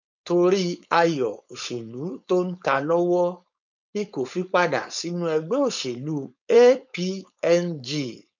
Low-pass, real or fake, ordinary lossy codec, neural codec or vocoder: 7.2 kHz; fake; none; codec, 16 kHz, 4.8 kbps, FACodec